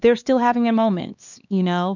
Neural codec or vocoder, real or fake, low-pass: codec, 16 kHz, 2 kbps, X-Codec, HuBERT features, trained on LibriSpeech; fake; 7.2 kHz